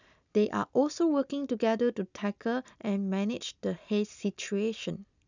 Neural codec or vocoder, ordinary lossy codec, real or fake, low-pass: none; none; real; 7.2 kHz